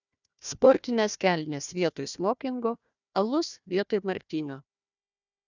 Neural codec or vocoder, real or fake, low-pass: codec, 16 kHz, 1 kbps, FunCodec, trained on Chinese and English, 50 frames a second; fake; 7.2 kHz